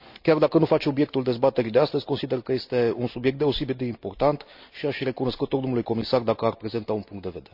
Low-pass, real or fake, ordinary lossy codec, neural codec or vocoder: 5.4 kHz; real; none; none